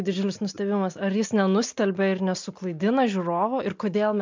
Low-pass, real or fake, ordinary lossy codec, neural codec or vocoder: 7.2 kHz; real; MP3, 64 kbps; none